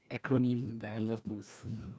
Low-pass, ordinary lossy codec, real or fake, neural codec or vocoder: none; none; fake; codec, 16 kHz, 1 kbps, FreqCodec, larger model